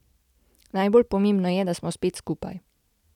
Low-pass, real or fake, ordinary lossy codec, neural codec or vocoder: 19.8 kHz; real; none; none